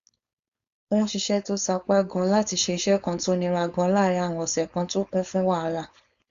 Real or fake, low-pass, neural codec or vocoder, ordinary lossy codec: fake; 7.2 kHz; codec, 16 kHz, 4.8 kbps, FACodec; Opus, 64 kbps